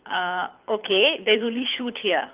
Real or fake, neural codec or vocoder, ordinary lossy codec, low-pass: fake; codec, 24 kHz, 6 kbps, HILCodec; Opus, 32 kbps; 3.6 kHz